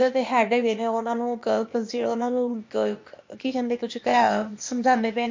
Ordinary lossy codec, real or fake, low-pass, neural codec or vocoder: MP3, 48 kbps; fake; 7.2 kHz; codec, 16 kHz, 0.8 kbps, ZipCodec